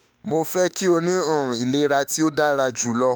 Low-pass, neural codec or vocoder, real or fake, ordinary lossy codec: none; autoencoder, 48 kHz, 32 numbers a frame, DAC-VAE, trained on Japanese speech; fake; none